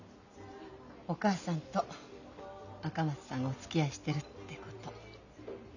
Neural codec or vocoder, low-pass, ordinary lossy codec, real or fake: none; 7.2 kHz; none; real